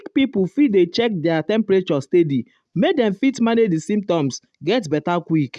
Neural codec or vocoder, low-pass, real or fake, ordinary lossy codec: none; none; real; none